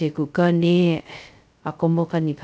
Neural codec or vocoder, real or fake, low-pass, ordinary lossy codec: codec, 16 kHz, 0.2 kbps, FocalCodec; fake; none; none